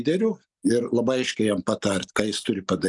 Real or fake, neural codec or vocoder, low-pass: real; none; 10.8 kHz